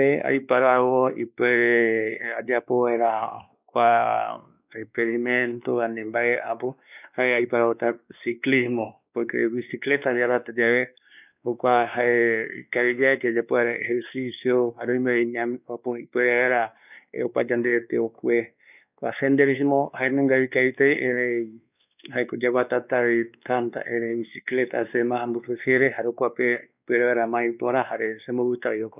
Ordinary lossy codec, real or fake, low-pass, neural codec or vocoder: none; fake; 3.6 kHz; codec, 16 kHz, 2 kbps, X-Codec, WavLM features, trained on Multilingual LibriSpeech